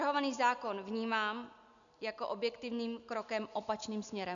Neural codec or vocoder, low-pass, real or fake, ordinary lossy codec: none; 7.2 kHz; real; MP3, 96 kbps